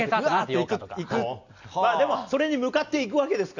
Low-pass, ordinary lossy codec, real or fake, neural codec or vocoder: 7.2 kHz; none; real; none